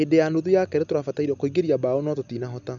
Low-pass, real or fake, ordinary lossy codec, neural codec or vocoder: 7.2 kHz; real; none; none